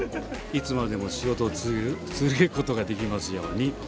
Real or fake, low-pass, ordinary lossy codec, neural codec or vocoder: real; none; none; none